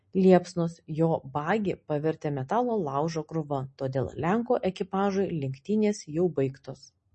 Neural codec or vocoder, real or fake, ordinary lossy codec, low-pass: none; real; MP3, 32 kbps; 10.8 kHz